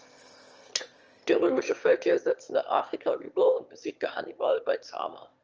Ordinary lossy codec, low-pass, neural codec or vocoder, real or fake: Opus, 24 kbps; 7.2 kHz; autoencoder, 22.05 kHz, a latent of 192 numbers a frame, VITS, trained on one speaker; fake